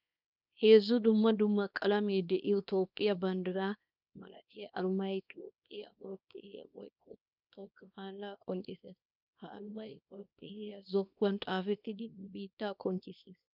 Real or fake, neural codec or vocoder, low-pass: fake; codec, 24 kHz, 0.9 kbps, WavTokenizer, small release; 5.4 kHz